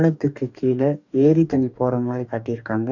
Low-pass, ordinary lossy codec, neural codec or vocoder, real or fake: 7.2 kHz; none; codec, 44.1 kHz, 2.6 kbps, DAC; fake